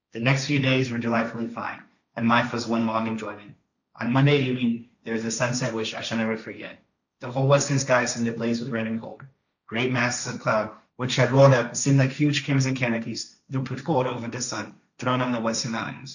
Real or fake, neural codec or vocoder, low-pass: fake; codec, 16 kHz, 1.1 kbps, Voila-Tokenizer; 7.2 kHz